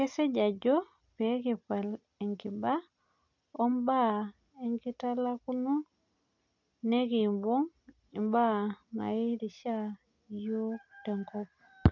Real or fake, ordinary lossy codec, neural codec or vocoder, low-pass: real; none; none; 7.2 kHz